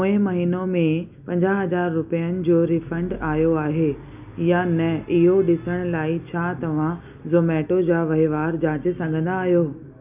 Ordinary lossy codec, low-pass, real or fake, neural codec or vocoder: none; 3.6 kHz; real; none